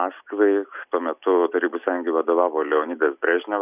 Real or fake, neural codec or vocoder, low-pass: real; none; 3.6 kHz